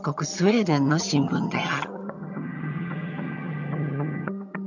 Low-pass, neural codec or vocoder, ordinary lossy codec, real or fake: 7.2 kHz; vocoder, 22.05 kHz, 80 mel bands, HiFi-GAN; none; fake